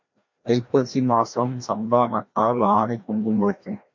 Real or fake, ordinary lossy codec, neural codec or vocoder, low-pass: fake; MP3, 48 kbps; codec, 16 kHz, 1 kbps, FreqCodec, larger model; 7.2 kHz